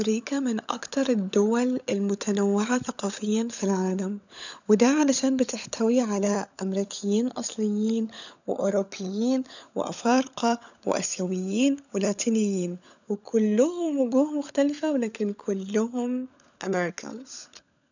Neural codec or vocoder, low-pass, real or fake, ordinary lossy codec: codec, 16 kHz, 16 kbps, FunCodec, trained on LibriTTS, 50 frames a second; 7.2 kHz; fake; none